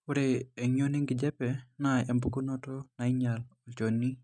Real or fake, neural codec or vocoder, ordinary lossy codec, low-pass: real; none; none; none